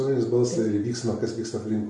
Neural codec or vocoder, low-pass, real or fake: none; 10.8 kHz; real